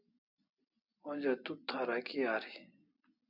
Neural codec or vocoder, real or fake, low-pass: none; real; 5.4 kHz